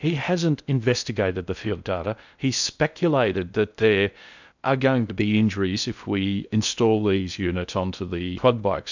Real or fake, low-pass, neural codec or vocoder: fake; 7.2 kHz; codec, 16 kHz in and 24 kHz out, 0.6 kbps, FocalCodec, streaming, 2048 codes